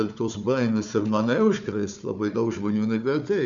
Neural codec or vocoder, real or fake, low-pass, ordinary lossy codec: codec, 16 kHz, 4 kbps, FunCodec, trained on Chinese and English, 50 frames a second; fake; 7.2 kHz; MP3, 96 kbps